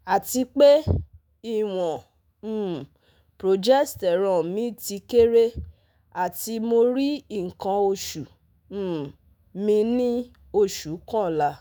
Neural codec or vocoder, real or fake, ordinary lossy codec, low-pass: autoencoder, 48 kHz, 128 numbers a frame, DAC-VAE, trained on Japanese speech; fake; none; none